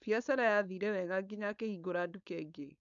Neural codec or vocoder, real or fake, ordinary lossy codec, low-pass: codec, 16 kHz, 4.8 kbps, FACodec; fake; none; 7.2 kHz